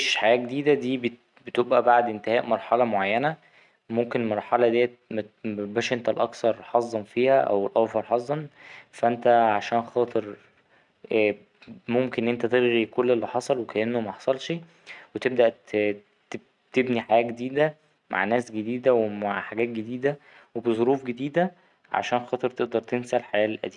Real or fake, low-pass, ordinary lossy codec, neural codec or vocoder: real; 10.8 kHz; none; none